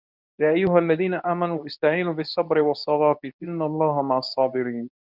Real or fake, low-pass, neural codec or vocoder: fake; 5.4 kHz; codec, 24 kHz, 0.9 kbps, WavTokenizer, medium speech release version 2